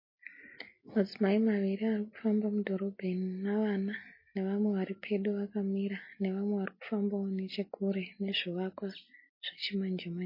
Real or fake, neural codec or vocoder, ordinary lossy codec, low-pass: real; none; MP3, 24 kbps; 5.4 kHz